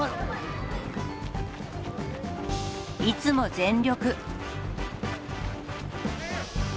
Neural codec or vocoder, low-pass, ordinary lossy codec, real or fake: none; none; none; real